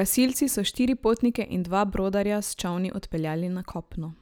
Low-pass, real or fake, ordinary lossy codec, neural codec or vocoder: none; real; none; none